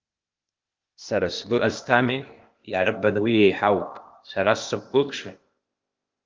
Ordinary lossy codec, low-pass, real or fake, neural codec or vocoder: Opus, 32 kbps; 7.2 kHz; fake; codec, 16 kHz, 0.8 kbps, ZipCodec